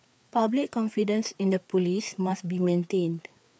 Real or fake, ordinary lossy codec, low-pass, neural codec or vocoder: fake; none; none; codec, 16 kHz, 4 kbps, FreqCodec, larger model